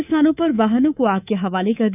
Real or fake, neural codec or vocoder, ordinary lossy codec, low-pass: fake; codec, 24 kHz, 3.1 kbps, DualCodec; none; 3.6 kHz